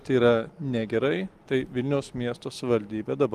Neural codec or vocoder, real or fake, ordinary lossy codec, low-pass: none; real; Opus, 24 kbps; 14.4 kHz